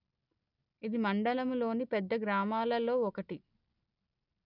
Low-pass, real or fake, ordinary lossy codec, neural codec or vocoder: 5.4 kHz; real; none; none